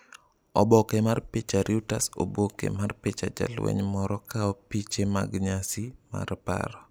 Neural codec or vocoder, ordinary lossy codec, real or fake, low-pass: none; none; real; none